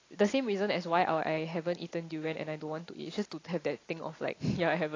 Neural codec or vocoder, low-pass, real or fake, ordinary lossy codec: none; 7.2 kHz; real; AAC, 32 kbps